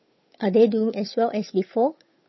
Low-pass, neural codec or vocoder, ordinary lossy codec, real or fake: 7.2 kHz; codec, 16 kHz, 8 kbps, FunCodec, trained on Chinese and English, 25 frames a second; MP3, 24 kbps; fake